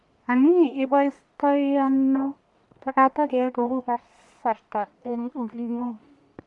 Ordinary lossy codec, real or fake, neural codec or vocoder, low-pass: none; fake; codec, 44.1 kHz, 1.7 kbps, Pupu-Codec; 10.8 kHz